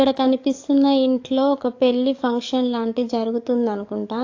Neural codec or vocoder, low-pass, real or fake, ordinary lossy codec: codec, 44.1 kHz, 7.8 kbps, DAC; 7.2 kHz; fake; AAC, 48 kbps